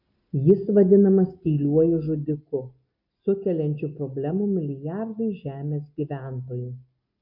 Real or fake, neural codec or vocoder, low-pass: real; none; 5.4 kHz